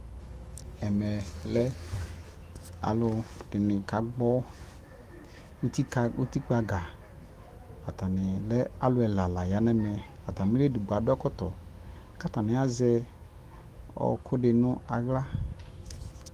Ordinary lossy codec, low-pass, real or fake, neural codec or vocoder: Opus, 24 kbps; 14.4 kHz; fake; autoencoder, 48 kHz, 128 numbers a frame, DAC-VAE, trained on Japanese speech